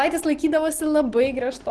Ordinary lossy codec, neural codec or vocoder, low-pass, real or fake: Opus, 16 kbps; none; 10.8 kHz; real